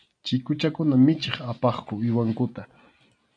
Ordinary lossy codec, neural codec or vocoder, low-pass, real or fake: MP3, 64 kbps; none; 9.9 kHz; real